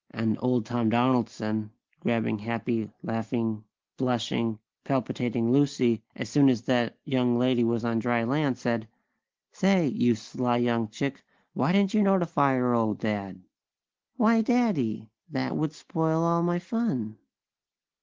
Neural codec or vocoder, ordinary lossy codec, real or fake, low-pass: none; Opus, 16 kbps; real; 7.2 kHz